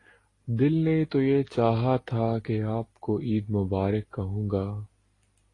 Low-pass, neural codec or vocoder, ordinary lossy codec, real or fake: 10.8 kHz; none; AAC, 32 kbps; real